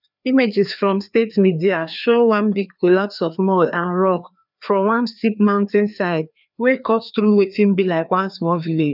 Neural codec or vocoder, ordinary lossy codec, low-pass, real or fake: codec, 16 kHz, 2 kbps, FreqCodec, larger model; none; 5.4 kHz; fake